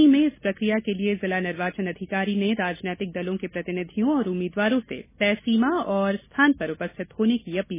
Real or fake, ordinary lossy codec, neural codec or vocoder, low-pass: real; MP3, 16 kbps; none; 3.6 kHz